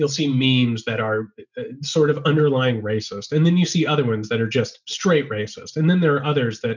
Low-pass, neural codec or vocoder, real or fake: 7.2 kHz; none; real